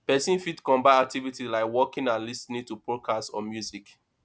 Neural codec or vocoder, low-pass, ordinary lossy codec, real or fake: none; none; none; real